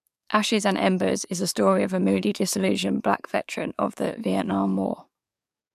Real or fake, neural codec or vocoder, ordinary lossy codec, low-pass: fake; codec, 44.1 kHz, 7.8 kbps, DAC; none; 14.4 kHz